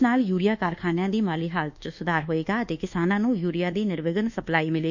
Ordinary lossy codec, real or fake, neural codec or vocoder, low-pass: none; fake; codec, 24 kHz, 1.2 kbps, DualCodec; 7.2 kHz